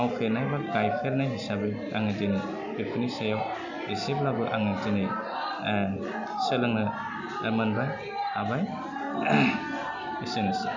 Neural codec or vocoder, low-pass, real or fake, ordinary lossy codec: none; 7.2 kHz; real; none